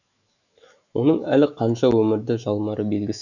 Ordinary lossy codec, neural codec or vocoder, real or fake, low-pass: none; autoencoder, 48 kHz, 128 numbers a frame, DAC-VAE, trained on Japanese speech; fake; 7.2 kHz